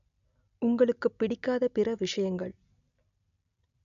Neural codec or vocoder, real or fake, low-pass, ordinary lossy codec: none; real; 7.2 kHz; none